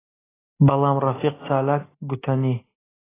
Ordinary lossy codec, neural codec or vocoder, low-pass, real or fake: AAC, 16 kbps; none; 3.6 kHz; real